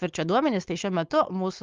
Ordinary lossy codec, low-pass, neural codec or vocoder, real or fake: Opus, 32 kbps; 7.2 kHz; codec, 16 kHz, 4.8 kbps, FACodec; fake